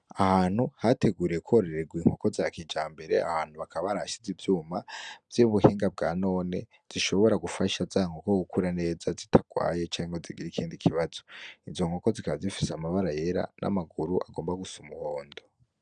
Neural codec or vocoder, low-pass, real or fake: none; 9.9 kHz; real